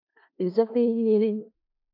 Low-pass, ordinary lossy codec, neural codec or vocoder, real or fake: 5.4 kHz; AAC, 32 kbps; codec, 16 kHz in and 24 kHz out, 0.4 kbps, LongCat-Audio-Codec, four codebook decoder; fake